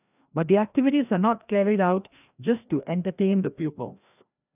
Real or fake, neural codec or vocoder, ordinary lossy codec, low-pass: fake; codec, 16 kHz, 1 kbps, FreqCodec, larger model; none; 3.6 kHz